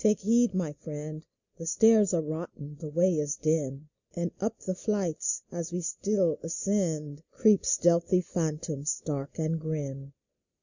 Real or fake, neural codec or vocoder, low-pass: real; none; 7.2 kHz